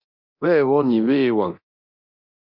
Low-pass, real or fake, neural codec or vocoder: 5.4 kHz; fake; codec, 24 kHz, 0.9 kbps, DualCodec